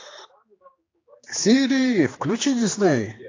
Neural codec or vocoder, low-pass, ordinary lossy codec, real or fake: codec, 16 kHz, 2 kbps, X-Codec, HuBERT features, trained on general audio; 7.2 kHz; AAC, 32 kbps; fake